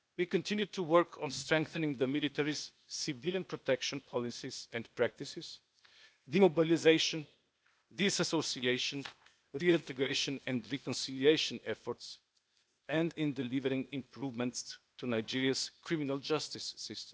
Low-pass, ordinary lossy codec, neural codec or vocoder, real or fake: none; none; codec, 16 kHz, 0.8 kbps, ZipCodec; fake